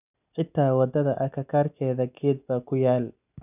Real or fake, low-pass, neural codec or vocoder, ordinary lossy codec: real; 3.6 kHz; none; none